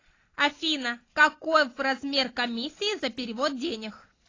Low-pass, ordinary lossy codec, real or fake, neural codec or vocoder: 7.2 kHz; AAC, 32 kbps; real; none